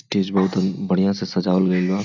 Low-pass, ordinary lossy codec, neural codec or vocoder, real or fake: 7.2 kHz; none; none; real